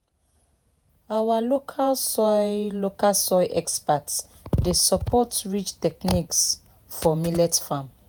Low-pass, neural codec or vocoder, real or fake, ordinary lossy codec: none; vocoder, 48 kHz, 128 mel bands, Vocos; fake; none